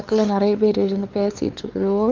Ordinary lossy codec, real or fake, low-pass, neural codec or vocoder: Opus, 24 kbps; fake; 7.2 kHz; codec, 16 kHz, 4 kbps, X-Codec, WavLM features, trained on Multilingual LibriSpeech